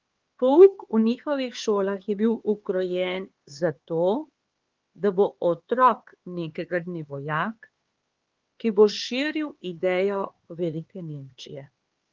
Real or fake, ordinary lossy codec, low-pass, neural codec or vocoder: fake; Opus, 16 kbps; 7.2 kHz; codec, 16 kHz, 4 kbps, X-Codec, HuBERT features, trained on LibriSpeech